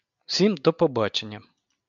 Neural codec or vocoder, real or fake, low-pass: none; real; 7.2 kHz